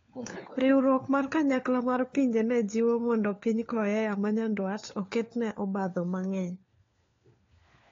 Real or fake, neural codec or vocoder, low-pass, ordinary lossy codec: fake; codec, 16 kHz, 4 kbps, FunCodec, trained on LibriTTS, 50 frames a second; 7.2 kHz; AAC, 32 kbps